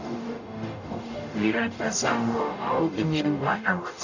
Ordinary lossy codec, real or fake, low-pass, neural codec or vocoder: none; fake; 7.2 kHz; codec, 44.1 kHz, 0.9 kbps, DAC